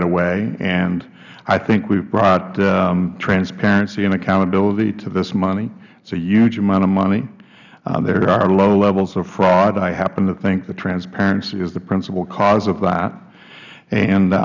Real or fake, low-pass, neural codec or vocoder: real; 7.2 kHz; none